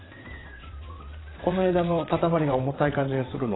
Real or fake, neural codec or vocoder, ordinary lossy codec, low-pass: fake; vocoder, 22.05 kHz, 80 mel bands, WaveNeXt; AAC, 16 kbps; 7.2 kHz